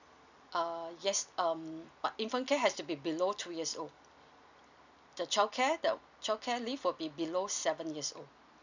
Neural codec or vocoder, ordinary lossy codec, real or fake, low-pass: none; none; real; 7.2 kHz